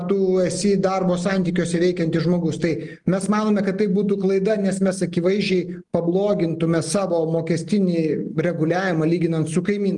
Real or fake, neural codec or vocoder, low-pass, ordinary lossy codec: real; none; 10.8 kHz; Opus, 32 kbps